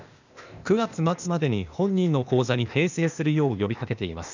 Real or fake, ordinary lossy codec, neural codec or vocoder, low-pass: fake; none; codec, 16 kHz, 0.8 kbps, ZipCodec; 7.2 kHz